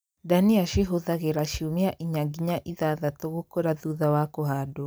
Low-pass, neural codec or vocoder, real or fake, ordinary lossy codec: none; none; real; none